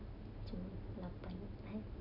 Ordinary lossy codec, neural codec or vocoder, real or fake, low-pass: none; none; real; 5.4 kHz